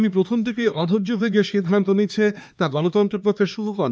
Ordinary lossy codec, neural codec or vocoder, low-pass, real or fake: none; codec, 16 kHz, 2 kbps, X-Codec, HuBERT features, trained on LibriSpeech; none; fake